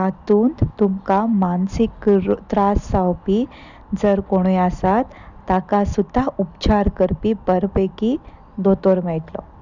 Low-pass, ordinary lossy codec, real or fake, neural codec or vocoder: 7.2 kHz; none; real; none